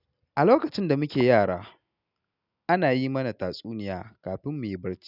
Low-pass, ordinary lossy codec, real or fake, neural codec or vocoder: 5.4 kHz; none; real; none